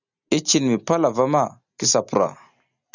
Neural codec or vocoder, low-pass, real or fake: none; 7.2 kHz; real